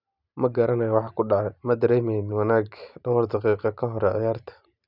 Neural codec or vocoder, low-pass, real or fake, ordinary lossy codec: vocoder, 44.1 kHz, 128 mel bands every 512 samples, BigVGAN v2; 5.4 kHz; fake; none